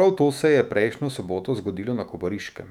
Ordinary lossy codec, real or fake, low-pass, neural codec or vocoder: none; fake; 19.8 kHz; autoencoder, 48 kHz, 128 numbers a frame, DAC-VAE, trained on Japanese speech